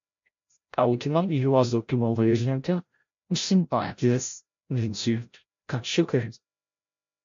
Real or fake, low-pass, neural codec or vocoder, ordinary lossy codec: fake; 7.2 kHz; codec, 16 kHz, 0.5 kbps, FreqCodec, larger model; MP3, 48 kbps